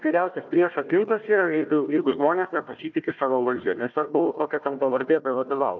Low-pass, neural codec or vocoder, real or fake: 7.2 kHz; codec, 16 kHz, 1 kbps, FunCodec, trained on Chinese and English, 50 frames a second; fake